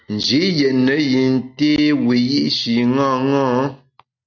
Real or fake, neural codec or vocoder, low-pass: real; none; 7.2 kHz